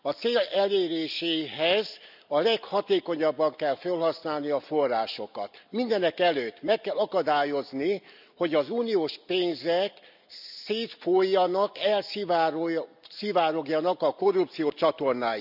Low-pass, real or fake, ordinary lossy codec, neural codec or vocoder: 5.4 kHz; real; none; none